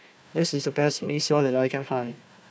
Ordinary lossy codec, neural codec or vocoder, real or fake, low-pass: none; codec, 16 kHz, 1 kbps, FunCodec, trained on Chinese and English, 50 frames a second; fake; none